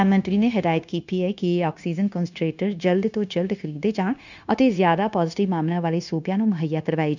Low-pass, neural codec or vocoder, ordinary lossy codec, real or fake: 7.2 kHz; codec, 16 kHz, 0.9 kbps, LongCat-Audio-Codec; none; fake